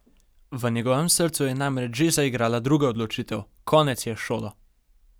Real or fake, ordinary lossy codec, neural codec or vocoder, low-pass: real; none; none; none